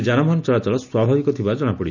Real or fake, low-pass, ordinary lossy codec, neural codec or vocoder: real; 7.2 kHz; none; none